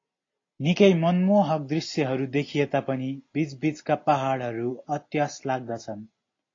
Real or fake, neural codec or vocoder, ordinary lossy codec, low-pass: real; none; AAC, 32 kbps; 7.2 kHz